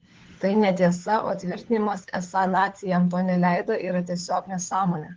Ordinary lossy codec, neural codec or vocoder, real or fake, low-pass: Opus, 16 kbps; codec, 16 kHz, 4 kbps, FunCodec, trained on LibriTTS, 50 frames a second; fake; 7.2 kHz